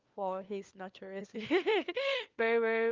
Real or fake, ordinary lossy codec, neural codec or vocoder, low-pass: fake; Opus, 32 kbps; codec, 16 kHz, 4 kbps, FunCodec, trained on LibriTTS, 50 frames a second; 7.2 kHz